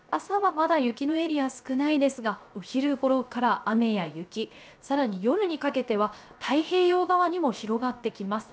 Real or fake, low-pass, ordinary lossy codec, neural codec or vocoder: fake; none; none; codec, 16 kHz, 0.7 kbps, FocalCodec